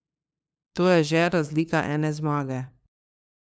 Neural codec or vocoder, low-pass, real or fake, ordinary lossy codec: codec, 16 kHz, 2 kbps, FunCodec, trained on LibriTTS, 25 frames a second; none; fake; none